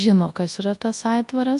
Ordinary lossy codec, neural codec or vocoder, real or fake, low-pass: AAC, 64 kbps; codec, 24 kHz, 0.9 kbps, WavTokenizer, large speech release; fake; 10.8 kHz